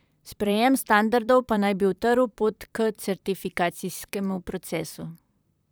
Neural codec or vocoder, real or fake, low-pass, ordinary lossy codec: vocoder, 44.1 kHz, 128 mel bands, Pupu-Vocoder; fake; none; none